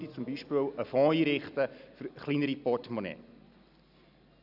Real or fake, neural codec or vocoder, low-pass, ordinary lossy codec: real; none; 5.4 kHz; none